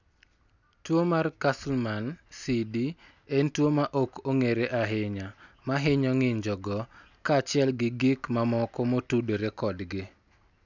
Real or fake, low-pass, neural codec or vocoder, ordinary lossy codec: real; 7.2 kHz; none; none